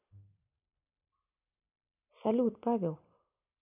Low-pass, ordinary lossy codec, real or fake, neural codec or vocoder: 3.6 kHz; none; real; none